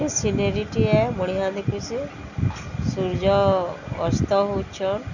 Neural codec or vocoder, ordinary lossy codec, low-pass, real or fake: none; none; 7.2 kHz; real